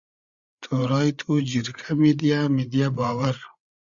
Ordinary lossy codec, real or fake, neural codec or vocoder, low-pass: Opus, 64 kbps; fake; codec, 16 kHz, 8 kbps, FreqCodec, larger model; 7.2 kHz